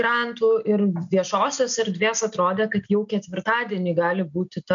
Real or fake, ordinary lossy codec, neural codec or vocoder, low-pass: real; MP3, 64 kbps; none; 7.2 kHz